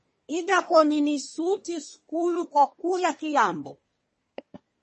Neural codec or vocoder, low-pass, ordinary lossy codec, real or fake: codec, 24 kHz, 1 kbps, SNAC; 10.8 kHz; MP3, 32 kbps; fake